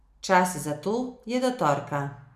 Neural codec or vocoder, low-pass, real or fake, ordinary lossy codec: none; 14.4 kHz; real; none